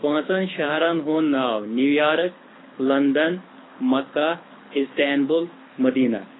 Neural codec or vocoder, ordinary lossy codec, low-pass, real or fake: codec, 24 kHz, 6 kbps, HILCodec; AAC, 16 kbps; 7.2 kHz; fake